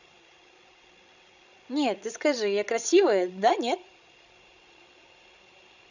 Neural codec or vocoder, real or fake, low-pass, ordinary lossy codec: codec, 16 kHz, 16 kbps, FreqCodec, larger model; fake; 7.2 kHz; none